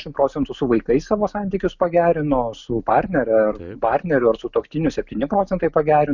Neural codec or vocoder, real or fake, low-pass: none; real; 7.2 kHz